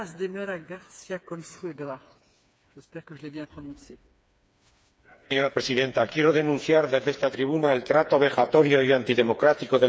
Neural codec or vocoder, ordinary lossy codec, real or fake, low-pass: codec, 16 kHz, 4 kbps, FreqCodec, smaller model; none; fake; none